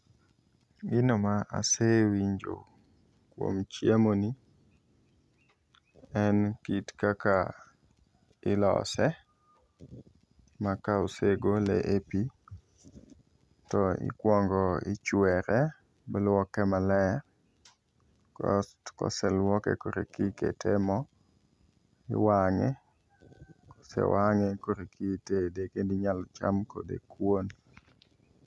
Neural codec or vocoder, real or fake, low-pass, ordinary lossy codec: none; real; none; none